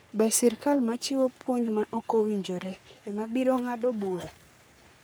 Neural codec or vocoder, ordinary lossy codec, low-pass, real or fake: codec, 44.1 kHz, 3.4 kbps, Pupu-Codec; none; none; fake